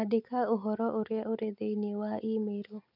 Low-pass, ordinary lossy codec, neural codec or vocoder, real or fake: 5.4 kHz; none; none; real